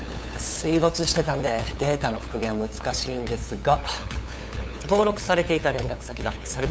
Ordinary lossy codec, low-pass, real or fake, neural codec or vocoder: none; none; fake; codec, 16 kHz, 8 kbps, FunCodec, trained on LibriTTS, 25 frames a second